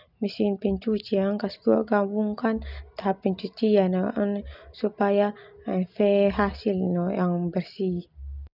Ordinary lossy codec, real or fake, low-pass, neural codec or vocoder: none; real; 5.4 kHz; none